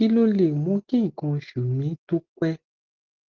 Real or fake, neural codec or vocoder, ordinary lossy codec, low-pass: real; none; Opus, 16 kbps; 7.2 kHz